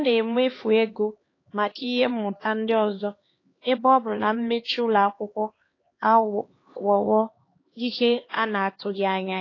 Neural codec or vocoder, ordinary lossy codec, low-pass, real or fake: codec, 16 kHz, 2 kbps, X-Codec, HuBERT features, trained on LibriSpeech; AAC, 32 kbps; 7.2 kHz; fake